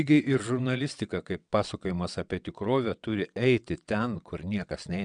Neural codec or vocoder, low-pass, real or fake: vocoder, 22.05 kHz, 80 mel bands, WaveNeXt; 9.9 kHz; fake